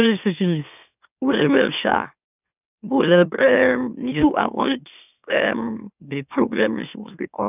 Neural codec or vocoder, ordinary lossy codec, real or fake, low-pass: autoencoder, 44.1 kHz, a latent of 192 numbers a frame, MeloTTS; none; fake; 3.6 kHz